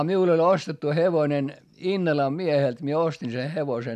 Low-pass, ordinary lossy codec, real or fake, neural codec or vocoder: 14.4 kHz; none; real; none